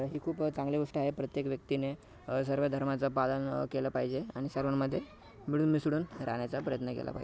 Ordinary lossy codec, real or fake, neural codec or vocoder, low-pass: none; real; none; none